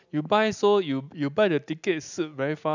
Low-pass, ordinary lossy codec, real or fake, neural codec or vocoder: 7.2 kHz; none; real; none